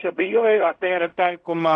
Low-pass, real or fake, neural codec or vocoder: 9.9 kHz; fake; codec, 16 kHz in and 24 kHz out, 0.4 kbps, LongCat-Audio-Codec, fine tuned four codebook decoder